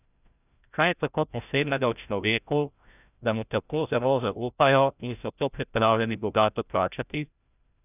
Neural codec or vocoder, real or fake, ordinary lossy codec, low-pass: codec, 16 kHz, 0.5 kbps, FreqCodec, larger model; fake; none; 3.6 kHz